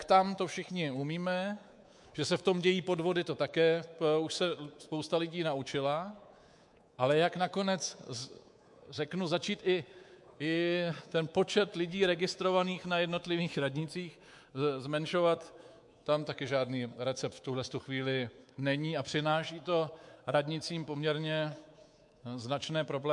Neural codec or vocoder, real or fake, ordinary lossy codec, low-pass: codec, 24 kHz, 3.1 kbps, DualCodec; fake; MP3, 64 kbps; 10.8 kHz